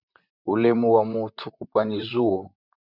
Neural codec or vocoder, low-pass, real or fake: vocoder, 44.1 kHz, 128 mel bands, Pupu-Vocoder; 5.4 kHz; fake